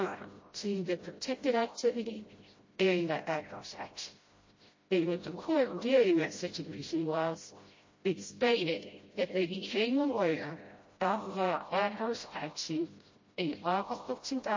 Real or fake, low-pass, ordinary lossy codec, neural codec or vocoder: fake; 7.2 kHz; MP3, 32 kbps; codec, 16 kHz, 0.5 kbps, FreqCodec, smaller model